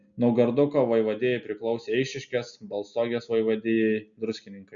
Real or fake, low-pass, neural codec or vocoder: real; 7.2 kHz; none